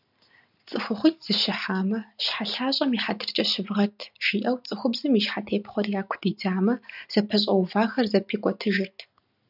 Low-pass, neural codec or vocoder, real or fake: 5.4 kHz; none; real